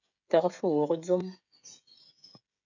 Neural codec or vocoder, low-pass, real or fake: codec, 16 kHz, 16 kbps, FreqCodec, smaller model; 7.2 kHz; fake